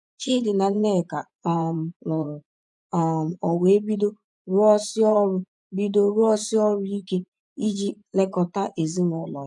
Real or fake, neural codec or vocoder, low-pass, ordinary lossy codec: fake; vocoder, 24 kHz, 100 mel bands, Vocos; 10.8 kHz; none